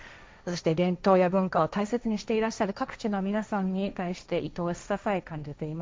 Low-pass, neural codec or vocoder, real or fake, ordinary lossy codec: none; codec, 16 kHz, 1.1 kbps, Voila-Tokenizer; fake; none